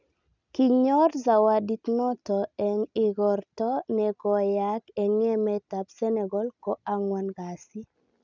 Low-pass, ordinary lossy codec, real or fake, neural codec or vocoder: 7.2 kHz; none; real; none